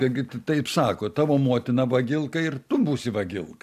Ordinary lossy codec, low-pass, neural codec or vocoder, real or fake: MP3, 96 kbps; 14.4 kHz; none; real